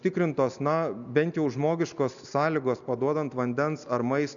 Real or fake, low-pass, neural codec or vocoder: real; 7.2 kHz; none